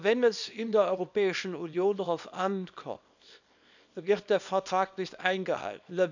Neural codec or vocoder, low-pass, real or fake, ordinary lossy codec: codec, 24 kHz, 0.9 kbps, WavTokenizer, small release; 7.2 kHz; fake; none